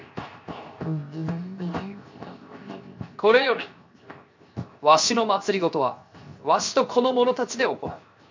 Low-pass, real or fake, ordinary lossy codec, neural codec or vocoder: 7.2 kHz; fake; MP3, 48 kbps; codec, 16 kHz, 0.7 kbps, FocalCodec